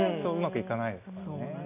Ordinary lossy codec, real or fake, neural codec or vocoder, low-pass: none; real; none; 3.6 kHz